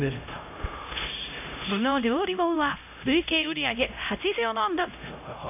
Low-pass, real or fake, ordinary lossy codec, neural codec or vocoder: 3.6 kHz; fake; AAC, 32 kbps; codec, 16 kHz, 0.5 kbps, X-Codec, HuBERT features, trained on LibriSpeech